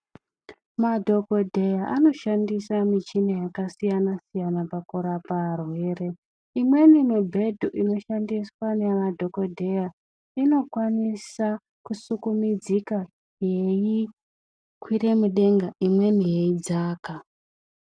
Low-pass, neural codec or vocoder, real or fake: 9.9 kHz; none; real